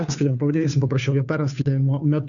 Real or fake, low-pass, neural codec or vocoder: fake; 7.2 kHz; codec, 16 kHz, 2 kbps, FunCodec, trained on Chinese and English, 25 frames a second